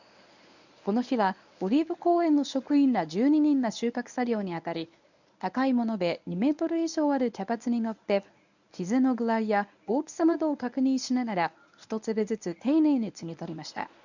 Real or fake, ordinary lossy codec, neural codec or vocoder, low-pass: fake; none; codec, 24 kHz, 0.9 kbps, WavTokenizer, medium speech release version 1; 7.2 kHz